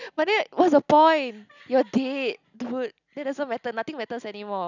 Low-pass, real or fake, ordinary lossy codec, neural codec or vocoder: 7.2 kHz; real; none; none